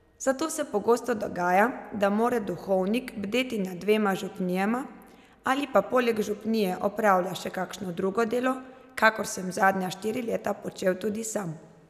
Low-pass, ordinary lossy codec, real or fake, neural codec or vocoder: 14.4 kHz; none; real; none